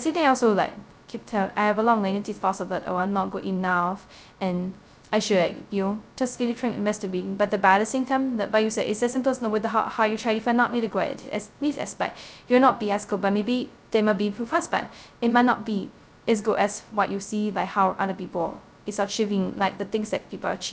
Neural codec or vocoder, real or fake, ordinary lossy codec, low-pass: codec, 16 kHz, 0.2 kbps, FocalCodec; fake; none; none